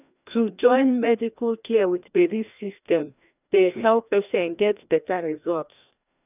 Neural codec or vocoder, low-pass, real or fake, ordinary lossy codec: codec, 16 kHz, 1 kbps, FreqCodec, larger model; 3.6 kHz; fake; none